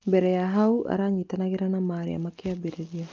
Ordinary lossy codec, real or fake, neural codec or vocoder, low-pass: Opus, 24 kbps; real; none; 7.2 kHz